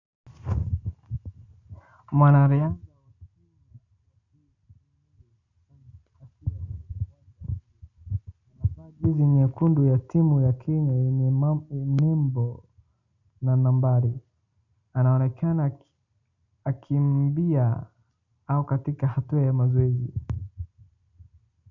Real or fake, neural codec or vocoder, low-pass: real; none; 7.2 kHz